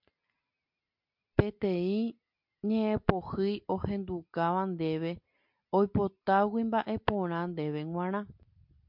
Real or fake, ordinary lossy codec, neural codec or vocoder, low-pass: real; MP3, 48 kbps; none; 5.4 kHz